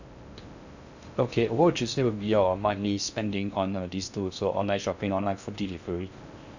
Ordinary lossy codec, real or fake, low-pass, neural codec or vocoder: none; fake; 7.2 kHz; codec, 16 kHz in and 24 kHz out, 0.6 kbps, FocalCodec, streaming, 2048 codes